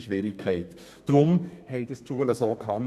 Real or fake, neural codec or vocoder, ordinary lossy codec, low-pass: fake; codec, 32 kHz, 1.9 kbps, SNAC; none; 14.4 kHz